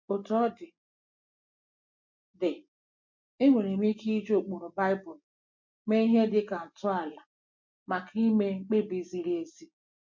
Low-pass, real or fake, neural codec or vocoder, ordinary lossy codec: 7.2 kHz; real; none; MP3, 48 kbps